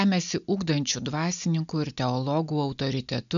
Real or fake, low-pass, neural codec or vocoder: real; 7.2 kHz; none